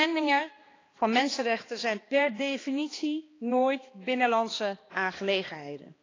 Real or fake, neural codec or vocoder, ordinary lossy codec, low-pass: fake; codec, 16 kHz, 2 kbps, X-Codec, HuBERT features, trained on balanced general audio; AAC, 32 kbps; 7.2 kHz